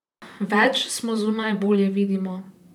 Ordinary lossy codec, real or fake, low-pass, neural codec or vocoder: none; fake; 19.8 kHz; vocoder, 44.1 kHz, 128 mel bands, Pupu-Vocoder